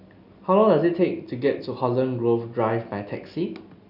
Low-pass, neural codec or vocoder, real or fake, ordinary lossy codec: 5.4 kHz; none; real; none